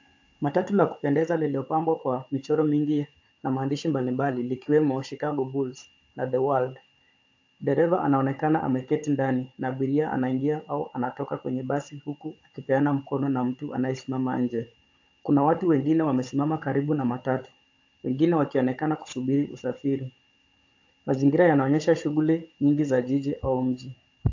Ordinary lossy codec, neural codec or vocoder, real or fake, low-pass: AAC, 48 kbps; codec, 16 kHz, 16 kbps, FunCodec, trained on Chinese and English, 50 frames a second; fake; 7.2 kHz